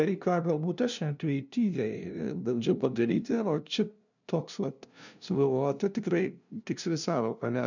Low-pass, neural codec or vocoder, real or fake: 7.2 kHz; codec, 16 kHz, 0.5 kbps, FunCodec, trained on LibriTTS, 25 frames a second; fake